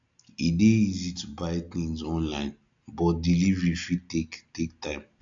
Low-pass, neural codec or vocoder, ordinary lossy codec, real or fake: 7.2 kHz; none; AAC, 64 kbps; real